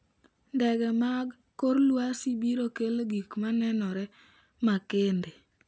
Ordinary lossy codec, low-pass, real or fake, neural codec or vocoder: none; none; real; none